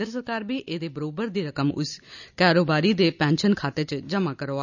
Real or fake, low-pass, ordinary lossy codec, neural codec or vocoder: real; 7.2 kHz; none; none